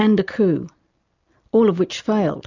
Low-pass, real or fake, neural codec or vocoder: 7.2 kHz; real; none